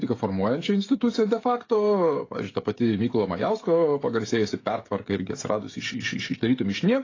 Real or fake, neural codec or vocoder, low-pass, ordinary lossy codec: fake; codec, 16 kHz, 16 kbps, FreqCodec, smaller model; 7.2 kHz; AAC, 32 kbps